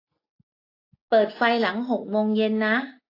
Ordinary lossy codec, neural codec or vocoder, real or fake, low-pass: AAC, 32 kbps; none; real; 5.4 kHz